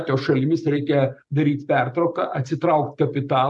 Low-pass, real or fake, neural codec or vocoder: 10.8 kHz; real; none